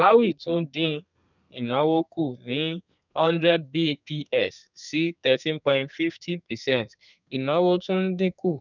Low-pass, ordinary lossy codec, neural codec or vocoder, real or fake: 7.2 kHz; none; codec, 32 kHz, 1.9 kbps, SNAC; fake